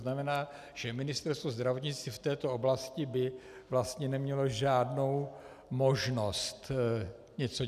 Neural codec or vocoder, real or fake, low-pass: none; real; 14.4 kHz